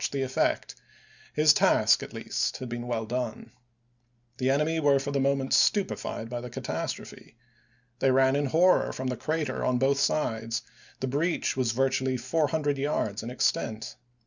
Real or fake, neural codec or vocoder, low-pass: real; none; 7.2 kHz